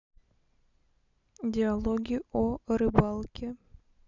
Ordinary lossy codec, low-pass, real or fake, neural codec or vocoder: none; 7.2 kHz; real; none